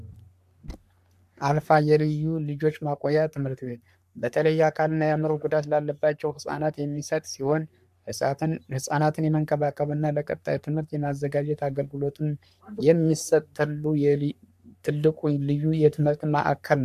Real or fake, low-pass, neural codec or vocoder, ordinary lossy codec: fake; 14.4 kHz; codec, 44.1 kHz, 3.4 kbps, Pupu-Codec; MP3, 96 kbps